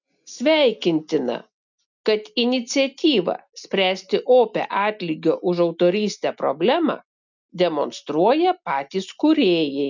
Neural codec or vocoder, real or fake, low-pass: none; real; 7.2 kHz